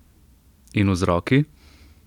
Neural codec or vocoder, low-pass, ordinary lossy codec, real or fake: none; 19.8 kHz; none; real